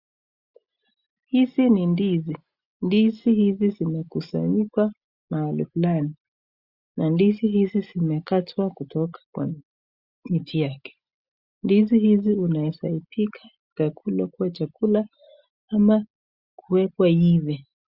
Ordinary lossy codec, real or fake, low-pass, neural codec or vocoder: Opus, 64 kbps; real; 5.4 kHz; none